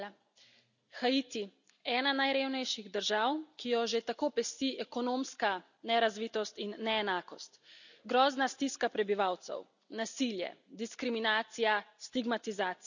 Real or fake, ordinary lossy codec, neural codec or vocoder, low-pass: real; none; none; 7.2 kHz